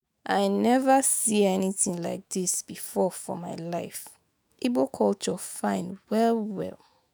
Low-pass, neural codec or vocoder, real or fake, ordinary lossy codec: none; autoencoder, 48 kHz, 128 numbers a frame, DAC-VAE, trained on Japanese speech; fake; none